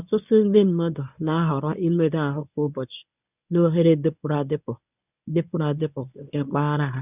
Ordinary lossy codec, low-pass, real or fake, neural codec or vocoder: none; 3.6 kHz; fake; codec, 24 kHz, 0.9 kbps, WavTokenizer, medium speech release version 1